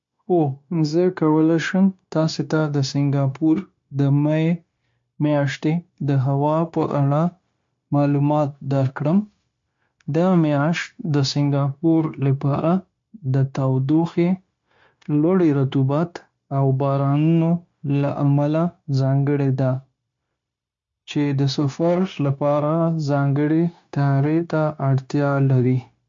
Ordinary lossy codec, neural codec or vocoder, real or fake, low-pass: MP3, 48 kbps; codec, 16 kHz, 0.9 kbps, LongCat-Audio-Codec; fake; 7.2 kHz